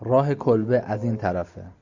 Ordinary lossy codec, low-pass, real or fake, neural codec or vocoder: Opus, 64 kbps; 7.2 kHz; real; none